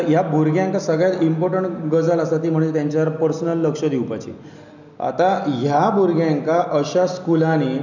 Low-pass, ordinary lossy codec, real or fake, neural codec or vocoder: 7.2 kHz; none; real; none